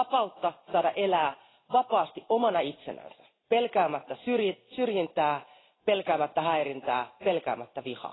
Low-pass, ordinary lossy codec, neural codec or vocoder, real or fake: 7.2 kHz; AAC, 16 kbps; none; real